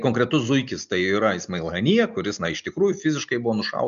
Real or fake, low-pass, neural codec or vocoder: real; 7.2 kHz; none